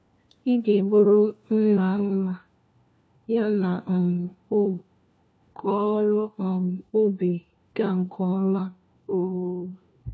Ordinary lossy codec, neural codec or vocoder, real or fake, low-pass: none; codec, 16 kHz, 1 kbps, FunCodec, trained on LibriTTS, 50 frames a second; fake; none